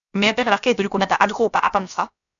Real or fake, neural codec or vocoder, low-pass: fake; codec, 16 kHz, about 1 kbps, DyCAST, with the encoder's durations; 7.2 kHz